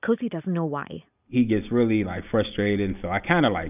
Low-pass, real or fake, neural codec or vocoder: 3.6 kHz; real; none